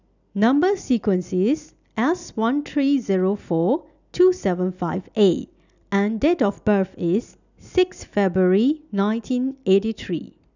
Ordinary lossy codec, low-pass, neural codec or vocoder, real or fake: none; 7.2 kHz; none; real